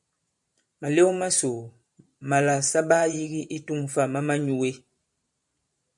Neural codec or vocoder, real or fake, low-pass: vocoder, 44.1 kHz, 128 mel bands every 512 samples, BigVGAN v2; fake; 10.8 kHz